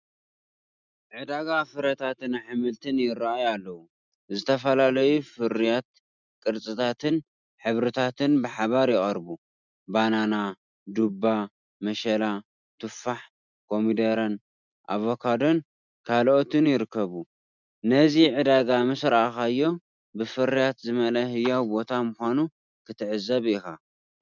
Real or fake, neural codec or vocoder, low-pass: real; none; 7.2 kHz